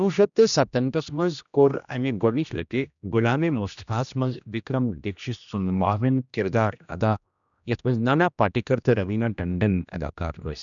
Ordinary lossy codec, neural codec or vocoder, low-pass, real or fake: none; codec, 16 kHz, 1 kbps, X-Codec, HuBERT features, trained on general audio; 7.2 kHz; fake